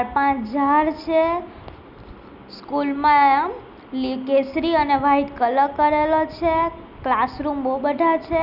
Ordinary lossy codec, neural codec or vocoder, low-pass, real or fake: none; none; 5.4 kHz; real